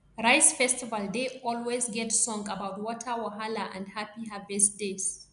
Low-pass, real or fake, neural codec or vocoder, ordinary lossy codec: 10.8 kHz; real; none; none